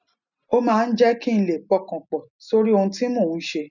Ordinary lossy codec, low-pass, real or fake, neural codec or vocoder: none; 7.2 kHz; real; none